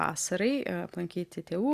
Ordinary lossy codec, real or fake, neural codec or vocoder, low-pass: Opus, 64 kbps; real; none; 14.4 kHz